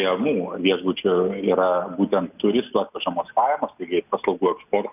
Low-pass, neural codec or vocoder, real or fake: 3.6 kHz; none; real